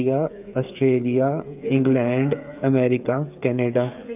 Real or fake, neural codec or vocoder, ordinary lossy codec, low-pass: fake; codec, 16 kHz, 8 kbps, FreqCodec, smaller model; none; 3.6 kHz